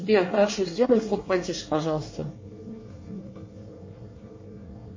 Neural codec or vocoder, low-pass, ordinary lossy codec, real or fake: codec, 24 kHz, 1 kbps, SNAC; 7.2 kHz; MP3, 32 kbps; fake